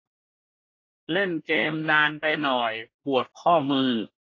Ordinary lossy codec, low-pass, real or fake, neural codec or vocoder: AAC, 32 kbps; 7.2 kHz; fake; codec, 24 kHz, 1 kbps, SNAC